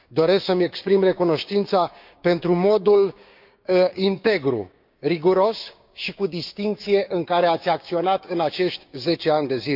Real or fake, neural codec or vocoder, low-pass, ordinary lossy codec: fake; codec, 16 kHz, 6 kbps, DAC; 5.4 kHz; none